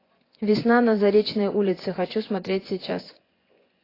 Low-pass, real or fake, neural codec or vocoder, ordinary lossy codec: 5.4 kHz; fake; vocoder, 24 kHz, 100 mel bands, Vocos; AAC, 24 kbps